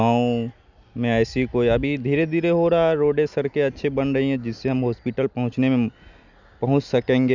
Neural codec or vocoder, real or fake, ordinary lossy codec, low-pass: none; real; none; 7.2 kHz